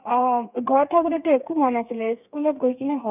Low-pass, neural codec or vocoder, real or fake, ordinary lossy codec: 3.6 kHz; codec, 32 kHz, 1.9 kbps, SNAC; fake; none